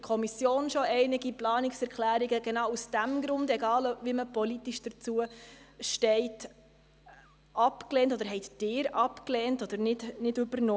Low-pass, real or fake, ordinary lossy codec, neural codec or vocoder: none; real; none; none